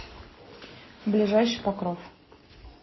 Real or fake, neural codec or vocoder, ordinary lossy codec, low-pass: real; none; MP3, 24 kbps; 7.2 kHz